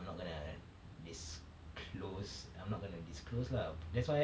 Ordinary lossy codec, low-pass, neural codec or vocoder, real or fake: none; none; none; real